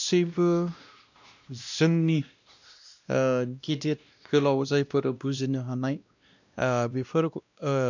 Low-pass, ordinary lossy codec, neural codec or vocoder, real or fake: 7.2 kHz; none; codec, 16 kHz, 1 kbps, X-Codec, WavLM features, trained on Multilingual LibriSpeech; fake